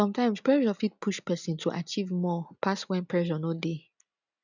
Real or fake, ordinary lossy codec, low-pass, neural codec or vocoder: real; none; 7.2 kHz; none